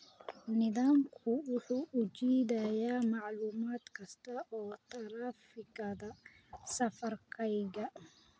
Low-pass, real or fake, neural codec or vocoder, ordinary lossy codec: none; real; none; none